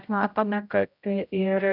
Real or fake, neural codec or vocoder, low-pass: fake; codec, 16 kHz, 0.5 kbps, X-Codec, HuBERT features, trained on general audio; 5.4 kHz